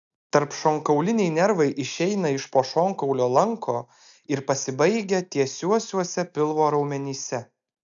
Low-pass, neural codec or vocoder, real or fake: 7.2 kHz; none; real